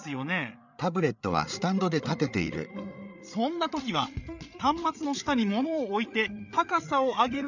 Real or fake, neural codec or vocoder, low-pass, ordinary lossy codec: fake; codec, 16 kHz, 8 kbps, FreqCodec, larger model; 7.2 kHz; none